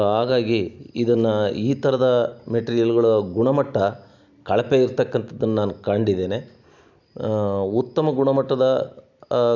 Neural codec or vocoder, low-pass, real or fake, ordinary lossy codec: none; 7.2 kHz; real; none